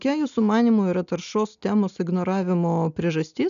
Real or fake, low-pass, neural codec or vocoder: real; 7.2 kHz; none